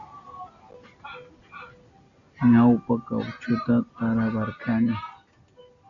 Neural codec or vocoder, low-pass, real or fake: none; 7.2 kHz; real